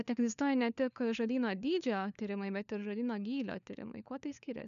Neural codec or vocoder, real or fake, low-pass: codec, 16 kHz, 4 kbps, FunCodec, trained on LibriTTS, 50 frames a second; fake; 7.2 kHz